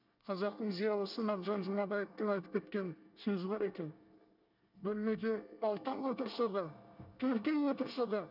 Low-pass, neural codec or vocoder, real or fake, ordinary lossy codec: 5.4 kHz; codec, 24 kHz, 1 kbps, SNAC; fake; none